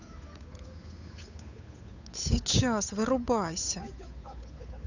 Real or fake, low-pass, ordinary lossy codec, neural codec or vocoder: fake; 7.2 kHz; none; codec, 16 kHz, 8 kbps, FunCodec, trained on Chinese and English, 25 frames a second